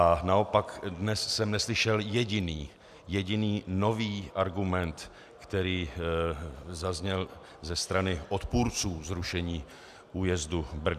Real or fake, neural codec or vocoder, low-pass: real; none; 14.4 kHz